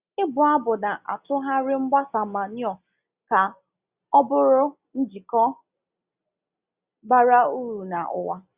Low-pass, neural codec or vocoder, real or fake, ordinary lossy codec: 3.6 kHz; none; real; Opus, 64 kbps